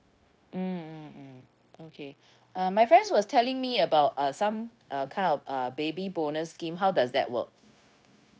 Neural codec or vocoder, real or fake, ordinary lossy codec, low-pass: codec, 16 kHz, 0.9 kbps, LongCat-Audio-Codec; fake; none; none